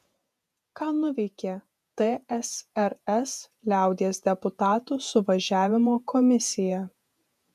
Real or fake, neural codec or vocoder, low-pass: fake; vocoder, 48 kHz, 128 mel bands, Vocos; 14.4 kHz